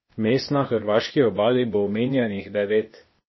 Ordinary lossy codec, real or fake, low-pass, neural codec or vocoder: MP3, 24 kbps; fake; 7.2 kHz; codec, 16 kHz, 0.8 kbps, ZipCodec